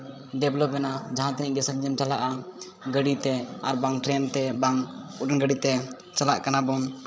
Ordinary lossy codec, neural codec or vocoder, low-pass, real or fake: none; codec, 16 kHz, 16 kbps, FreqCodec, larger model; none; fake